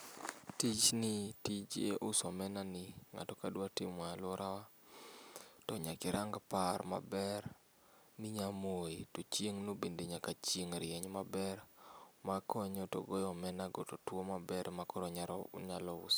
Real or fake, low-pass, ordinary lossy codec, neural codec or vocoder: real; none; none; none